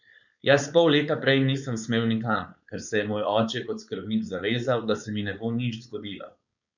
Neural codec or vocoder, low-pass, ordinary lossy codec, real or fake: codec, 16 kHz, 4.8 kbps, FACodec; 7.2 kHz; none; fake